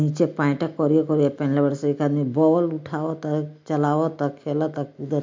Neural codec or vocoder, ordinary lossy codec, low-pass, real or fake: none; MP3, 48 kbps; 7.2 kHz; real